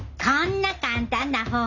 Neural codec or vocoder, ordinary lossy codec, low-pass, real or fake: none; none; 7.2 kHz; real